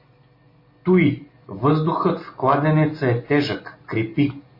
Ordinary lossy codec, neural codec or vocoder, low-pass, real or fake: MP3, 24 kbps; none; 5.4 kHz; real